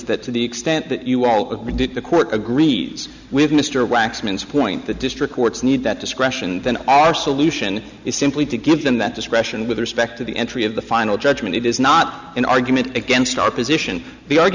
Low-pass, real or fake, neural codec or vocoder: 7.2 kHz; real; none